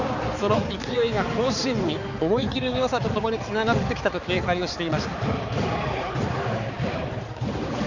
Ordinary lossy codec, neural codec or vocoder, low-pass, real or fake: none; codec, 16 kHz, 4 kbps, X-Codec, HuBERT features, trained on balanced general audio; 7.2 kHz; fake